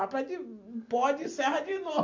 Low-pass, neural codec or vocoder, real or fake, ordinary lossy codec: 7.2 kHz; none; real; AAC, 48 kbps